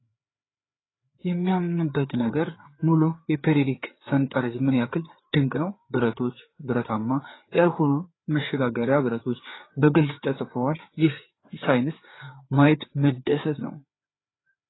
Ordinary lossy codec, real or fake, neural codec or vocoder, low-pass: AAC, 16 kbps; fake; codec, 16 kHz, 4 kbps, FreqCodec, larger model; 7.2 kHz